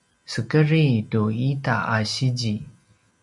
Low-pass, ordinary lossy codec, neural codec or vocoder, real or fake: 10.8 kHz; MP3, 96 kbps; none; real